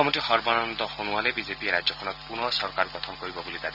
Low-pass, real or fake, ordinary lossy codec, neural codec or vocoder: 5.4 kHz; real; Opus, 64 kbps; none